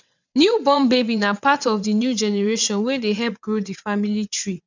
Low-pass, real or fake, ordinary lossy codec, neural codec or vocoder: 7.2 kHz; fake; none; vocoder, 22.05 kHz, 80 mel bands, WaveNeXt